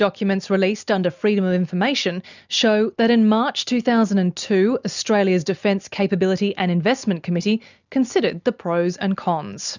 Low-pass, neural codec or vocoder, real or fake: 7.2 kHz; none; real